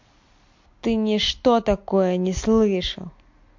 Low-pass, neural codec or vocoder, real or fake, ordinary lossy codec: 7.2 kHz; none; real; MP3, 48 kbps